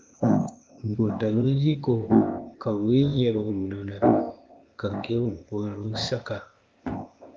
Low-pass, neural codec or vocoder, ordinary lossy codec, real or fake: 7.2 kHz; codec, 16 kHz, 0.8 kbps, ZipCodec; Opus, 24 kbps; fake